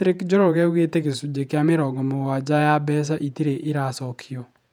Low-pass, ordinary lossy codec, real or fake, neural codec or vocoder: 19.8 kHz; none; fake; vocoder, 48 kHz, 128 mel bands, Vocos